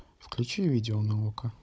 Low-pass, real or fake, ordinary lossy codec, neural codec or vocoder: none; fake; none; codec, 16 kHz, 16 kbps, FunCodec, trained on Chinese and English, 50 frames a second